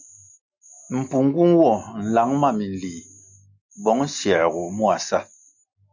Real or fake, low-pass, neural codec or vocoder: real; 7.2 kHz; none